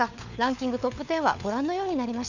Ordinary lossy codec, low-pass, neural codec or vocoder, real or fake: none; 7.2 kHz; codec, 16 kHz, 4 kbps, FunCodec, trained on LibriTTS, 50 frames a second; fake